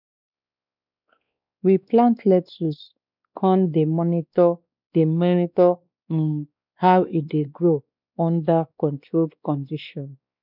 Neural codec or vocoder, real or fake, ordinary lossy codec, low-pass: codec, 16 kHz, 2 kbps, X-Codec, WavLM features, trained on Multilingual LibriSpeech; fake; none; 5.4 kHz